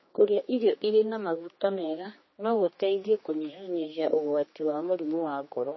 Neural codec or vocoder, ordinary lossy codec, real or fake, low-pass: codec, 16 kHz, 2 kbps, X-Codec, HuBERT features, trained on general audio; MP3, 24 kbps; fake; 7.2 kHz